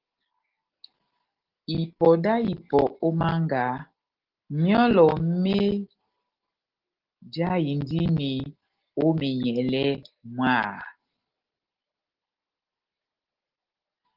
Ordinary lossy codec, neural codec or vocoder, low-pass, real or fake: Opus, 16 kbps; none; 5.4 kHz; real